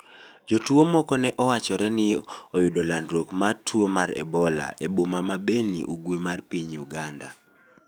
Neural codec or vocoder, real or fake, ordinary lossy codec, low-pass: codec, 44.1 kHz, 7.8 kbps, DAC; fake; none; none